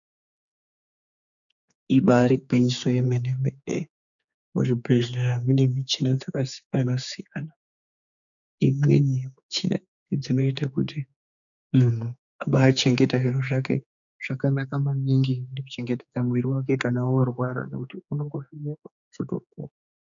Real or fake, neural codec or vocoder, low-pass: fake; codec, 16 kHz, 4 kbps, X-Codec, HuBERT features, trained on general audio; 7.2 kHz